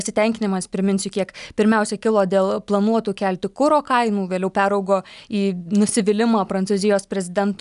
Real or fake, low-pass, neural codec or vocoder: real; 10.8 kHz; none